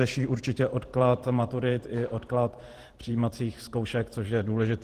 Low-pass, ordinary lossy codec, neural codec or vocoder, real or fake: 14.4 kHz; Opus, 16 kbps; vocoder, 48 kHz, 128 mel bands, Vocos; fake